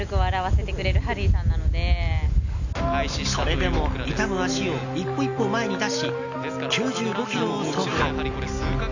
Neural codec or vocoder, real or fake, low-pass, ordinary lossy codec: none; real; 7.2 kHz; none